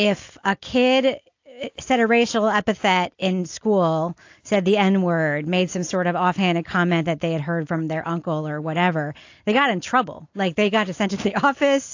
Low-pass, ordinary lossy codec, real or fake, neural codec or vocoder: 7.2 kHz; AAC, 48 kbps; real; none